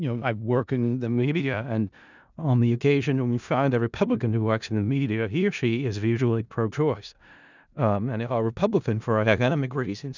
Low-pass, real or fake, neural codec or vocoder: 7.2 kHz; fake; codec, 16 kHz in and 24 kHz out, 0.4 kbps, LongCat-Audio-Codec, four codebook decoder